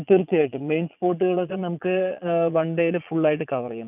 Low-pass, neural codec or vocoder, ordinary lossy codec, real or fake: 3.6 kHz; vocoder, 22.05 kHz, 80 mel bands, Vocos; none; fake